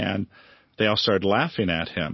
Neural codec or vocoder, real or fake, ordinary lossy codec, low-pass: none; real; MP3, 24 kbps; 7.2 kHz